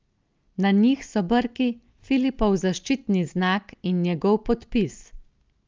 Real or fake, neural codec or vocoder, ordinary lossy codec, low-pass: real; none; Opus, 24 kbps; 7.2 kHz